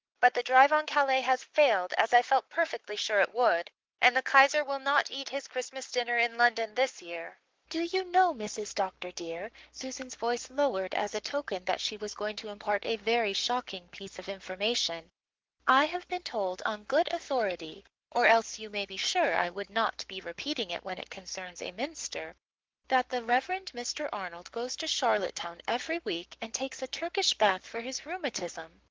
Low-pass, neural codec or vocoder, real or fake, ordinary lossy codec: 7.2 kHz; codec, 44.1 kHz, 7.8 kbps, Pupu-Codec; fake; Opus, 16 kbps